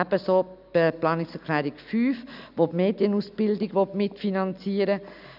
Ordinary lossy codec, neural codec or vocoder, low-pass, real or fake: none; none; 5.4 kHz; real